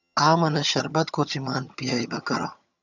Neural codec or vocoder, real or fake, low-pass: vocoder, 22.05 kHz, 80 mel bands, HiFi-GAN; fake; 7.2 kHz